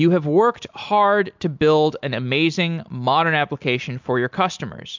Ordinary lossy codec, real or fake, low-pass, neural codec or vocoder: MP3, 64 kbps; real; 7.2 kHz; none